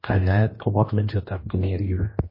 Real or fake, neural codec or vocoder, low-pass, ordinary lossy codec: fake; codec, 16 kHz, 1 kbps, X-Codec, HuBERT features, trained on general audio; 5.4 kHz; MP3, 24 kbps